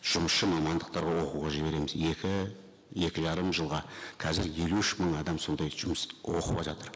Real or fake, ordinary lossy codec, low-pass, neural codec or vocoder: real; none; none; none